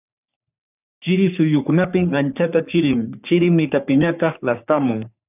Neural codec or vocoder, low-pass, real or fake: codec, 44.1 kHz, 3.4 kbps, Pupu-Codec; 3.6 kHz; fake